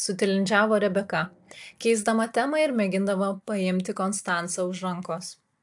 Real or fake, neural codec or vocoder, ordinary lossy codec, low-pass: real; none; AAC, 64 kbps; 10.8 kHz